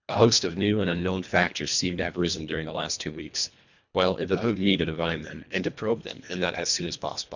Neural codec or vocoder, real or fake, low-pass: codec, 24 kHz, 1.5 kbps, HILCodec; fake; 7.2 kHz